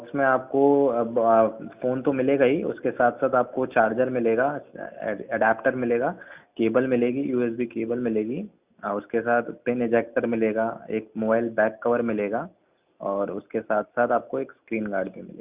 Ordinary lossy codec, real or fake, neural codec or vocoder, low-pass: Opus, 64 kbps; real; none; 3.6 kHz